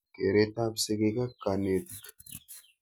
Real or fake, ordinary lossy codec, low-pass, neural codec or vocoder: real; none; none; none